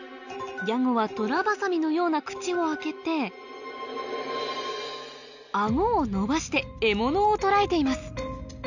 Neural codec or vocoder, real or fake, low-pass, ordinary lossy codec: none; real; 7.2 kHz; none